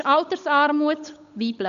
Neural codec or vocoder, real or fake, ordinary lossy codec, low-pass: codec, 16 kHz, 8 kbps, FunCodec, trained on Chinese and English, 25 frames a second; fake; none; 7.2 kHz